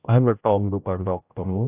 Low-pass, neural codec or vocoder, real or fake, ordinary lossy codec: 3.6 kHz; codec, 16 kHz, 0.5 kbps, X-Codec, HuBERT features, trained on general audio; fake; AAC, 24 kbps